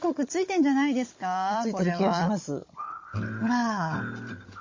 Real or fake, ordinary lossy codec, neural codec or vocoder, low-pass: fake; MP3, 32 kbps; codec, 16 kHz, 16 kbps, FunCodec, trained on Chinese and English, 50 frames a second; 7.2 kHz